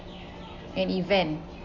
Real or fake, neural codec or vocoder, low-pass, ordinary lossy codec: real; none; 7.2 kHz; none